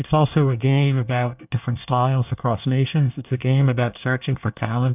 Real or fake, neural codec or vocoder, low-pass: fake; codec, 24 kHz, 1 kbps, SNAC; 3.6 kHz